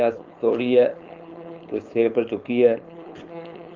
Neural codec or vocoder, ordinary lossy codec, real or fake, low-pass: codec, 16 kHz, 4.8 kbps, FACodec; Opus, 24 kbps; fake; 7.2 kHz